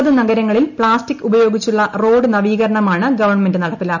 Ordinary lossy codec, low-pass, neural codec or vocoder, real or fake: none; 7.2 kHz; none; real